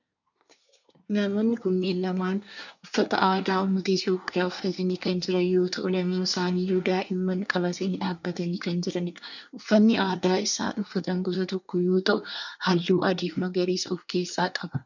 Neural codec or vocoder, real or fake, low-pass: codec, 24 kHz, 1 kbps, SNAC; fake; 7.2 kHz